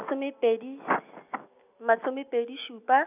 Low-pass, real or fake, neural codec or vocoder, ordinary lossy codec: 3.6 kHz; real; none; none